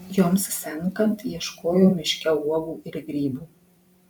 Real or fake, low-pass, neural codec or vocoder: fake; 19.8 kHz; vocoder, 44.1 kHz, 128 mel bands every 512 samples, BigVGAN v2